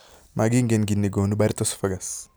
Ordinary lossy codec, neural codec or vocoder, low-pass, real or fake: none; none; none; real